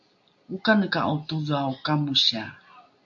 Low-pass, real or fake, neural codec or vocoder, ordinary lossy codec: 7.2 kHz; real; none; AAC, 64 kbps